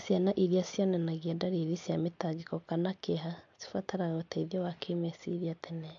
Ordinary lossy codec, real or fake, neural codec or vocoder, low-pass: MP3, 48 kbps; real; none; 7.2 kHz